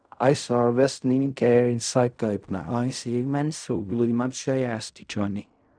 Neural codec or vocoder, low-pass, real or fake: codec, 16 kHz in and 24 kHz out, 0.4 kbps, LongCat-Audio-Codec, fine tuned four codebook decoder; 9.9 kHz; fake